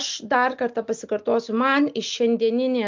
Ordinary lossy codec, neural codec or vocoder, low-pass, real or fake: MP3, 64 kbps; none; 7.2 kHz; real